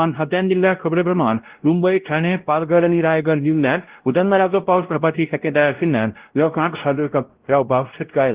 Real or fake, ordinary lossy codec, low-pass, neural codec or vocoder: fake; Opus, 16 kbps; 3.6 kHz; codec, 16 kHz, 0.5 kbps, X-Codec, WavLM features, trained on Multilingual LibriSpeech